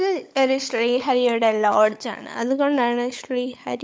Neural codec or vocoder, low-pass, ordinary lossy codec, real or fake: codec, 16 kHz, 16 kbps, FunCodec, trained on LibriTTS, 50 frames a second; none; none; fake